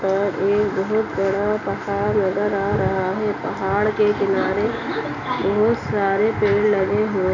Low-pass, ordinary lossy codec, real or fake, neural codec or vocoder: 7.2 kHz; none; real; none